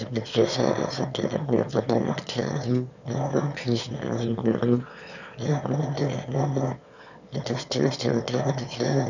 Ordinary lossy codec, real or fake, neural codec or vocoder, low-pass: none; fake; autoencoder, 22.05 kHz, a latent of 192 numbers a frame, VITS, trained on one speaker; 7.2 kHz